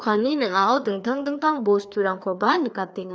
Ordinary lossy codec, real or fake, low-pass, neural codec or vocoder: none; fake; none; codec, 16 kHz, 2 kbps, FreqCodec, larger model